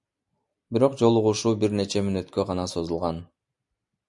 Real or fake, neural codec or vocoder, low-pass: real; none; 10.8 kHz